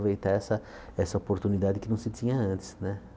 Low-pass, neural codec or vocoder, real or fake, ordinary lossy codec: none; none; real; none